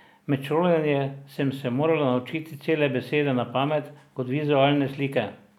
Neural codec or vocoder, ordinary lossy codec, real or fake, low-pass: none; none; real; 19.8 kHz